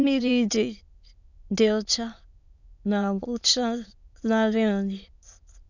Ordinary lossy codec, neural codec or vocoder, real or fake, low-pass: none; autoencoder, 22.05 kHz, a latent of 192 numbers a frame, VITS, trained on many speakers; fake; 7.2 kHz